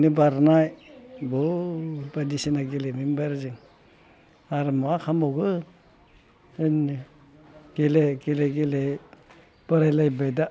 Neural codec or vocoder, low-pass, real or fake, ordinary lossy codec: none; none; real; none